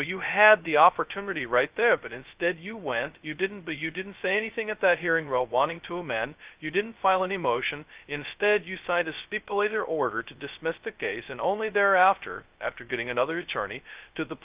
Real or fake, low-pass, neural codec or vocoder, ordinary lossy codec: fake; 3.6 kHz; codec, 16 kHz, 0.2 kbps, FocalCodec; Opus, 24 kbps